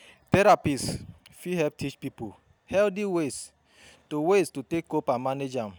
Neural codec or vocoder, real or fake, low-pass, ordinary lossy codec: none; real; none; none